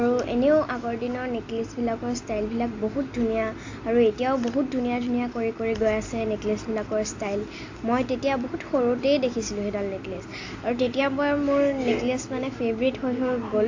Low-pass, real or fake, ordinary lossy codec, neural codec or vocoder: 7.2 kHz; real; AAC, 48 kbps; none